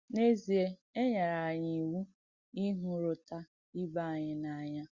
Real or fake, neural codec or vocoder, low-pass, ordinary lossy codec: real; none; 7.2 kHz; Opus, 64 kbps